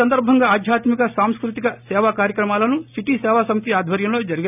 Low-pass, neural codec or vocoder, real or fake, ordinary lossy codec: 3.6 kHz; none; real; none